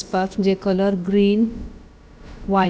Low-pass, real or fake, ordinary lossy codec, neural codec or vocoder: none; fake; none; codec, 16 kHz, about 1 kbps, DyCAST, with the encoder's durations